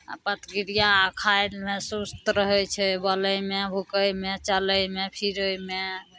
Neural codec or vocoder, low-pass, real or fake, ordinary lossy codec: none; none; real; none